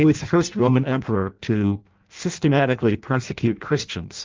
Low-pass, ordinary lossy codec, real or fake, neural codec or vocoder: 7.2 kHz; Opus, 24 kbps; fake; codec, 16 kHz in and 24 kHz out, 0.6 kbps, FireRedTTS-2 codec